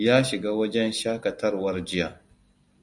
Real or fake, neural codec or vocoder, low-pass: real; none; 10.8 kHz